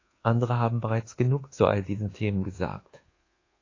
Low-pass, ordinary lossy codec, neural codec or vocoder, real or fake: 7.2 kHz; AAC, 32 kbps; codec, 24 kHz, 1.2 kbps, DualCodec; fake